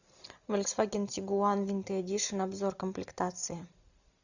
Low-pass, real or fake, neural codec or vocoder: 7.2 kHz; real; none